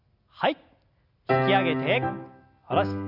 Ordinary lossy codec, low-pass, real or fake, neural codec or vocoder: Opus, 64 kbps; 5.4 kHz; real; none